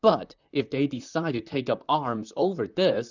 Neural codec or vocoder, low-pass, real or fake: vocoder, 44.1 kHz, 128 mel bands, Pupu-Vocoder; 7.2 kHz; fake